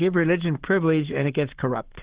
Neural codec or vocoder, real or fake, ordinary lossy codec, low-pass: codec, 44.1 kHz, 7.8 kbps, Pupu-Codec; fake; Opus, 16 kbps; 3.6 kHz